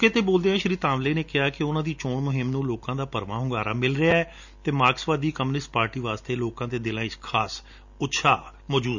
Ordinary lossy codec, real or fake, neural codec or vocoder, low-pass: none; real; none; 7.2 kHz